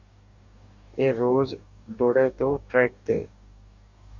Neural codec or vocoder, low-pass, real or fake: codec, 44.1 kHz, 2.6 kbps, DAC; 7.2 kHz; fake